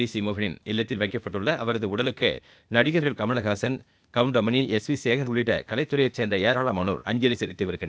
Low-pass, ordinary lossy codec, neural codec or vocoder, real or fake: none; none; codec, 16 kHz, 0.8 kbps, ZipCodec; fake